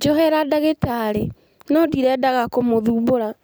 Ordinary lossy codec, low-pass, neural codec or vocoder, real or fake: none; none; none; real